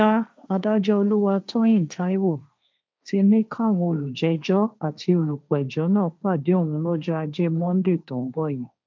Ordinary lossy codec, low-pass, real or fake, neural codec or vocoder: none; none; fake; codec, 16 kHz, 1.1 kbps, Voila-Tokenizer